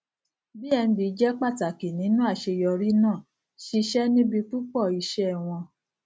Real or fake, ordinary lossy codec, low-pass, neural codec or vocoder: real; none; none; none